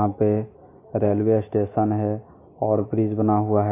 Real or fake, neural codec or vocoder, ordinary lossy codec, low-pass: real; none; AAC, 24 kbps; 3.6 kHz